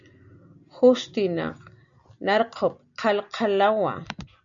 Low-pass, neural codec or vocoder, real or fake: 7.2 kHz; none; real